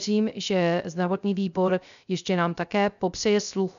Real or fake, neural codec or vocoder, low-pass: fake; codec, 16 kHz, 0.3 kbps, FocalCodec; 7.2 kHz